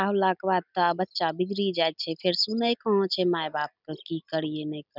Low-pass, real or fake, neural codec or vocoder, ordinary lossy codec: 5.4 kHz; real; none; none